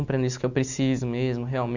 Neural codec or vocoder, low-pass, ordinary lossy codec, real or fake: none; 7.2 kHz; none; real